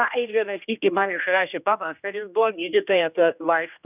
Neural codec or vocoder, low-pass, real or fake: codec, 16 kHz, 1 kbps, X-Codec, HuBERT features, trained on general audio; 3.6 kHz; fake